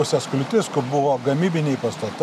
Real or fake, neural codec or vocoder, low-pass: real; none; 14.4 kHz